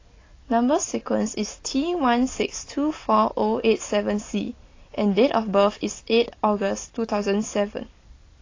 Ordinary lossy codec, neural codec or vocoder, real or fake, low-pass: AAC, 32 kbps; none; real; 7.2 kHz